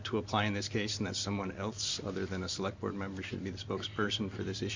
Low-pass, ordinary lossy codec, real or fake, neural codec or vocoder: 7.2 kHz; MP3, 64 kbps; real; none